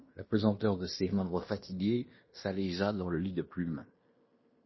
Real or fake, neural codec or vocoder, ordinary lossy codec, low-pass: fake; codec, 16 kHz in and 24 kHz out, 0.9 kbps, LongCat-Audio-Codec, fine tuned four codebook decoder; MP3, 24 kbps; 7.2 kHz